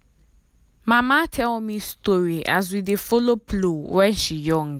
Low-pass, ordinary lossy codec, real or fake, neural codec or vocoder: 19.8 kHz; none; real; none